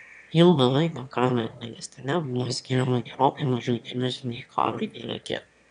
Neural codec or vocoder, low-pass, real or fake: autoencoder, 22.05 kHz, a latent of 192 numbers a frame, VITS, trained on one speaker; 9.9 kHz; fake